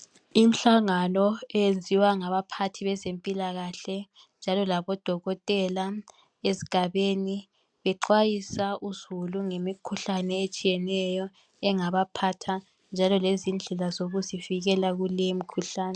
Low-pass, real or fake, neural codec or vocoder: 9.9 kHz; real; none